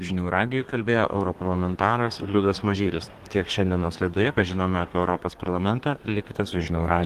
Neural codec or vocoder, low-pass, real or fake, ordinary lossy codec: codec, 32 kHz, 1.9 kbps, SNAC; 14.4 kHz; fake; Opus, 24 kbps